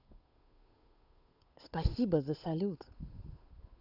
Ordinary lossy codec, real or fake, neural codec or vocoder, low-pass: none; fake; codec, 16 kHz, 8 kbps, FunCodec, trained on Chinese and English, 25 frames a second; 5.4 kHz